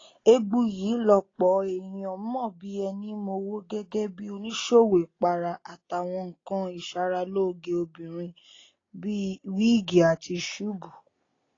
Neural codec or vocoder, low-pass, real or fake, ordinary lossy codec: none; 7.2 kHz; real; AAC, 32 kbps